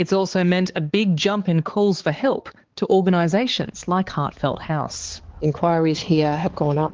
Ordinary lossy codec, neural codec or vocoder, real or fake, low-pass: Opus, 16 kbps; codec, 16 kHz, 4 kbps, X-Codec, HuBERT features, trained on balanced general audio; fake; 7.2 kHz